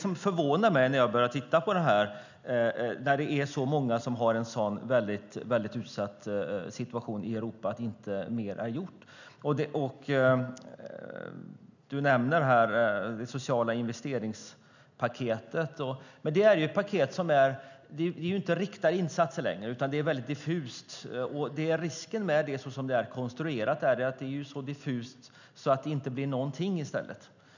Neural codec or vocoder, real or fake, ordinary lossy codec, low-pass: none; real; none; 7.2 kHz